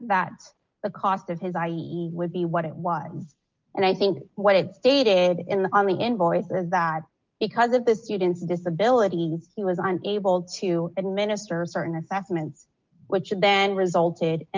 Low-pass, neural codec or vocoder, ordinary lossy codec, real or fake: 7.2 kHz; none; Opus, 24 kbps; real